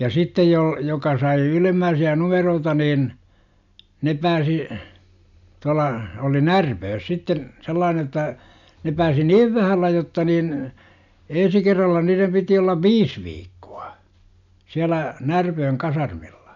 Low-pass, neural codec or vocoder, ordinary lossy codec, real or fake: 7.2 kHz; none; none; real